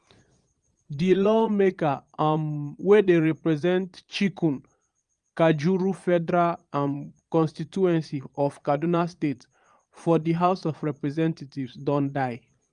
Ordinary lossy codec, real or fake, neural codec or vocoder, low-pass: Opus, 32 kbps; fake; vocoder, 22.05 kHz, 80 mel bands, Vocos; 9.9 kHz